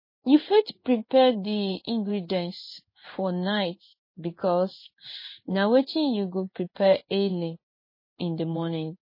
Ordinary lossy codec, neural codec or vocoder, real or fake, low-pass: MP3, 24 kbps; codec, 16 kHz in and 24 kHz out, 1 kbps, XY-Tokenizer; fake; 5.4 kHz